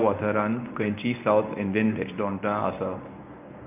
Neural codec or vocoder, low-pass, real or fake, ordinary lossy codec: codec, 24 kHz, 0.9 kbps, WavTokenizer, medium speech release version 1; 3.6 kHz; fake; none